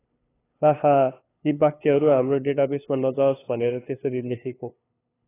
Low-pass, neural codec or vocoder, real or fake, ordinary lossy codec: 3.6 kHz; codec, 16 kHz, 2 kbps, FunCodec, trained on LibriTTS, 25 frames a second; fake; AAC, 16 kbps